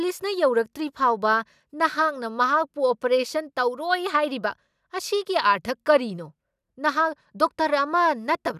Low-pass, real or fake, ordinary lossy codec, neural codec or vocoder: 14.4 kHz; real; none; none